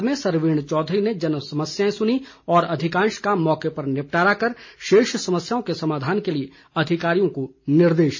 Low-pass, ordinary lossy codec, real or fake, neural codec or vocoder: 7.2 kHz; MP3, 32 kbps; real; none